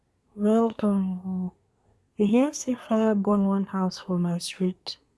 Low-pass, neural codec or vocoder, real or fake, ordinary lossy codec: none; codec, 24 kHz, 1 kbps, SNAC; fake; none